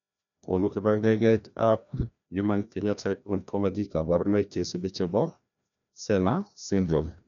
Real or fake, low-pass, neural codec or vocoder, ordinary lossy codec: fake; 7.2 kHz; codec, 16 kHz, 1 kbps, FreqCodec, larger model; none